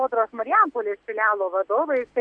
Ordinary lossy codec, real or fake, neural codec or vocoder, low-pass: MP3, 96 kbps; real; none; 9.9 kHz